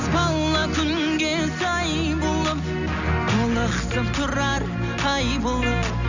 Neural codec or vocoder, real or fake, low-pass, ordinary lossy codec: none; real; 7.2 kHz; none